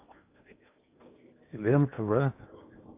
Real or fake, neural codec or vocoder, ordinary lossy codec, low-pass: fake; codec, 16 kHz in and 24 kHz out, 0.8 kbps, FocalCodec, streaming, 65536 codes; AAC, 32 kbps; 3.6 kHz